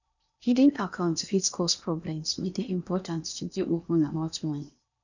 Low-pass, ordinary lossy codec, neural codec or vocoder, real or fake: 7.2 kHz; none; codec, 16 kHz in and 24 kHz out, 0.8 kbps, FocalCodec, streaming, 65536 codes; fake